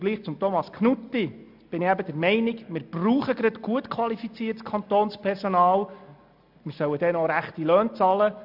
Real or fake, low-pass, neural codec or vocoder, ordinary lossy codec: real; 5.4 kHz; none; AAC, 48 kbps